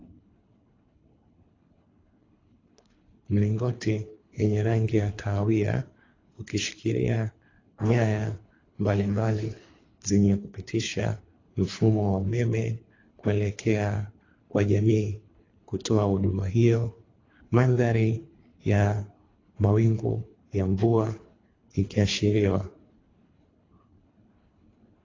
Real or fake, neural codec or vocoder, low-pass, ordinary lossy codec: fake; codec, 24 kHz, 3 kbps, HILCodec; 7.2 kHz; AAC, 32 kbps